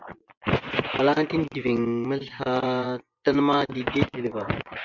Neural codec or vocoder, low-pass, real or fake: vocoder, 24 kHz, 100 mel bands, Vocos; 7.2 kHz; fake